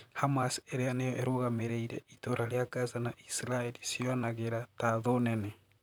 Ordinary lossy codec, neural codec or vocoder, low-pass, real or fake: none; vocoder, 44.1 kHz, 128 mel bands, Pupu-Vocoder; none; fake